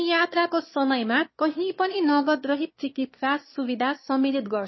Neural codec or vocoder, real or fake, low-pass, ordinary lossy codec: autoencoder, 22.05 kHz, a latent of 192 numbers a frame, VITS, trained on one speaker; fake; 7.2 kHz; MP3, 24 kbps